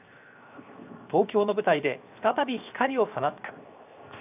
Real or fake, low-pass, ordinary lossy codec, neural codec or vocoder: fake; 3.6 kHz; AAC, 32 kbps; codec, 16 kHz, 0.7 kbps, FocalCodec